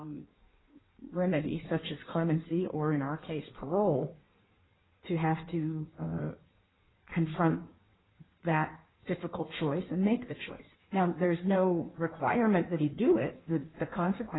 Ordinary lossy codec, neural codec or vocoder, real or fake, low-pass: AAC, 16 kbps; codec, 16 kHz in and 24 kHz out, 1.1 kbps, FireRedTTS-2 codec; fake; 7.2 kHz